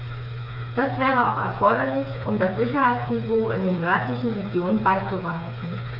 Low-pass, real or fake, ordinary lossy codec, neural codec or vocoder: 5.4 kHz; fake; none; codec, 16 kHz, 4 kbps, FreqCodec, smaller model